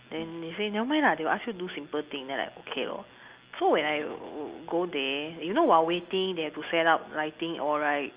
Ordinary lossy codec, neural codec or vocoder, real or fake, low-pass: Opus, 24 kbps; none; real; 3.6 kHz